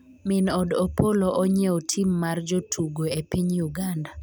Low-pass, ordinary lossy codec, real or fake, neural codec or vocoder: none; none; real; none